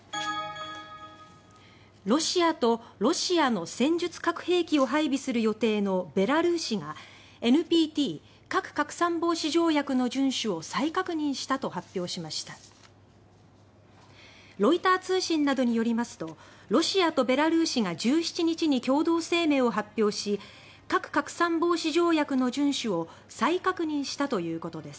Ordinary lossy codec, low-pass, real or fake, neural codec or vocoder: none; none; real; none